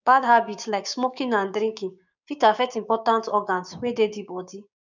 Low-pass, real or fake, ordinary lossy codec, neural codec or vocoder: 7.2 kHz; fake; none; codec, 16 kHz, 6 kbps, DAC